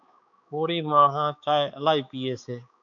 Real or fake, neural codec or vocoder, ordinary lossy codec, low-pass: fake; codec, 16 kHz, 4 kbps, X-Codec, HuBERT features, trained on balanced general audio; MP3, 96 kbps; 7.2 kHz